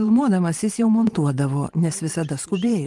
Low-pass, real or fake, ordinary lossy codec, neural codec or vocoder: 10.8 kHz; fake; Opus, 24 kbps; vocoder, 44.1 kHz, 128 mel bands every 512 samples, BigVGAN v2